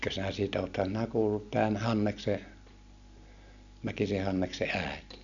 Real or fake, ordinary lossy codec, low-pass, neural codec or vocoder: real; none; 7.2 kHz; none